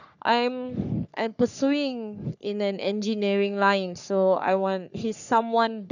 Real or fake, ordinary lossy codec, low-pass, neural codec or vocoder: fake; none; 7.2 kHz; codec, 44.1 kHz, 3.4 kbps, Pupu-Codec